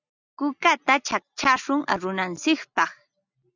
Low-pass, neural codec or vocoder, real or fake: 7.2 kHz; none; real